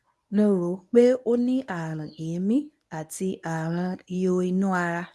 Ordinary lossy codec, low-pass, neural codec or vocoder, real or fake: none; none; codec, 24 kHz, 0.9 kbps, WavTokenizer, medium speech release version 1; fake